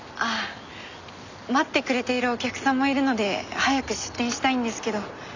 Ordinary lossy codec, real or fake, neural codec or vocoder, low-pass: none; real; none; 7.2 kHz